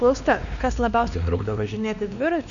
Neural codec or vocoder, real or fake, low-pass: codec, 16 kHz, 2 kbps, X-Codec, WavLM features, trained on Multilingual LibriSpeech; fake; 7.2 kHz